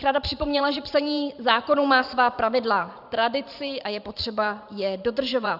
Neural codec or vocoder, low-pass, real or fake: vocoder, 44.1 kHz, 128 mel bands every 512 samples, BigVGAN v2; 5.4 kHz; fake